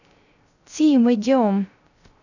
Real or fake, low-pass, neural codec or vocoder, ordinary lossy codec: fake; 7.2 kHz; codec, 16 kHz, 0.3 kbps, FocalCodec; none